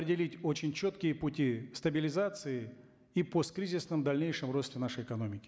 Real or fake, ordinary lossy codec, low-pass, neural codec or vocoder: real; none; none; none